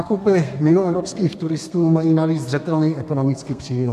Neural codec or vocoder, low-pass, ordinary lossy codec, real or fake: codec, 32 kHz, 1.9 kbps, SNAC; 14.4 kHz; AAC, 96 kbps; fake